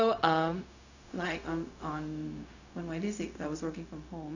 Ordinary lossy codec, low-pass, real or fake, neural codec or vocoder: none; 7.2 kHz; fake; codec, 16 kHz, 0.4 kbps, LongCat-Audio-Codec